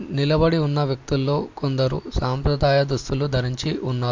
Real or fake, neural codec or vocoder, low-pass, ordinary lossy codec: real; none; 7.2 kHz; MP3, 48 kbps